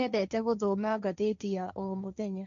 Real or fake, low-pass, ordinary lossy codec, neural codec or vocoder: fake; 7.2 kHz; none; codec, 16 kHz, 1.1 kbps, Voila-Tokenizer